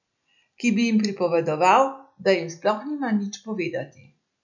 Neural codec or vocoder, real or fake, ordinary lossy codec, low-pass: none; real; none; 7.2 kHz